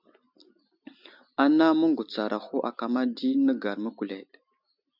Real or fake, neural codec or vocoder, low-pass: real; none; 5.4 kHz